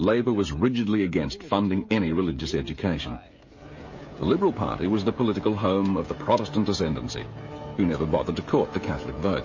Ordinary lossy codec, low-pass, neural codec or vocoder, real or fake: MP3, 32 kbps; 7.2 kHz; codec, 16 kHz, 16 kbps, FreqCodec, smaller model; fake